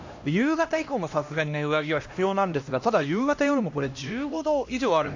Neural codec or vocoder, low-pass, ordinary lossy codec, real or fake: codec, 16 kHz, 1 kbps, X-Codec, HuBERT features, trained on LibriSpeech; 7.2 kHz; AAC, 48 kbps; fake